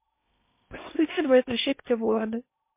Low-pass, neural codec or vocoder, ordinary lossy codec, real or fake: 3.6 kHz; codec, 16 kHz in and 24 kHz out, 0.8 kbps, FocalCodec, streaming, 65536 codes; MP3, 24 kbps; fake